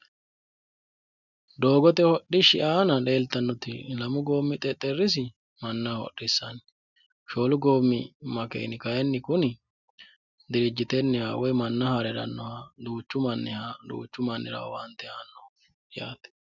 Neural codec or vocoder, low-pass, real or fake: none; 7.2 kHz; real